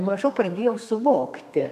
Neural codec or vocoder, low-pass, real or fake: codec, 32 kHz, 1.9 kbps, SNAC; 14.4 kHz; fake